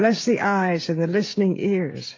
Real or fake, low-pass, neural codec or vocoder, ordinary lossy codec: fake; 7.2 kHz; codec, 16 kHz, 16 kbps, FreqCodec, smaller model; AAC, 32 kbps